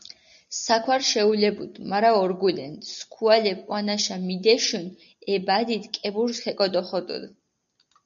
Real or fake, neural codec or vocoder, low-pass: real; none; 7.2 kHz